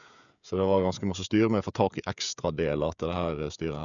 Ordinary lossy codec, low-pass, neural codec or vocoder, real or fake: none; 7.2 kHz; codec, 16 kHz, 16 kbps, FreqCodec, smaller model; fake